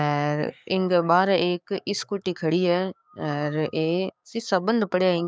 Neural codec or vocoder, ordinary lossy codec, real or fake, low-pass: codec, 16 kHz, 6 kbps, DAC; none; fake; none